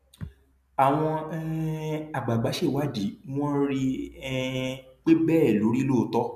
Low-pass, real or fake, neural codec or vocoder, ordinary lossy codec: 14.4 kHz; real; none; MP3, 96 kbps